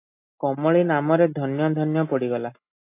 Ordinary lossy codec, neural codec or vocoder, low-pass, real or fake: AAC, 24 kbps; none; 3.6 kHz; real